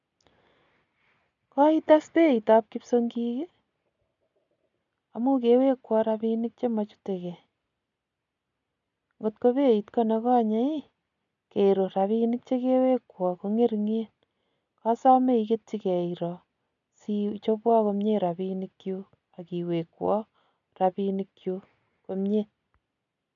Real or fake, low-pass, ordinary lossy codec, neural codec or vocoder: real; 7.2 kHz; none; none